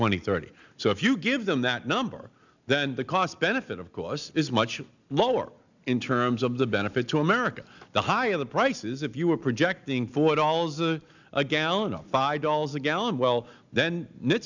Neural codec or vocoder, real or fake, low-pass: none; real; 7.2 kHz